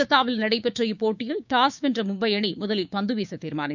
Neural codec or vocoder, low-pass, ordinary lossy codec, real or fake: codec, 16 kHz, 6 kbps, DAC; 7.2 kHz; none; fake